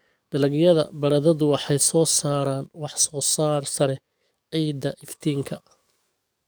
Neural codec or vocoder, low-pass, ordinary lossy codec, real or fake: codec, 44.1 kHz, 7.8 kbps, DAC; none; none; fake